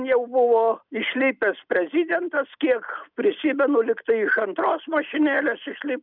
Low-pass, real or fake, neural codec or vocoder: 5.4 kHz; real; none